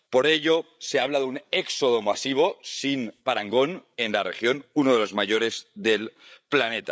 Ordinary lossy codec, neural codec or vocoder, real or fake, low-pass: none; codec, 16 kHz, 8 kbps, FreqCodec, larger model; fake; none